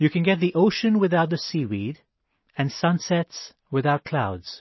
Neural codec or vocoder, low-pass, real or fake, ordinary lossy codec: none; 7.2 kHz; real; MP3, 24 kbps